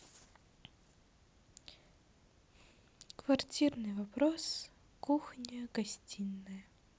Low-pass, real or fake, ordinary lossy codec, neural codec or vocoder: none; real; none; none